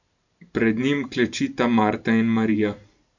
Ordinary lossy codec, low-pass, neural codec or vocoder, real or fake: none; 7.2 kHz; vocoder, 44.1 kHz, 128 mel bands every 512 samples, BigVGAN v2; fake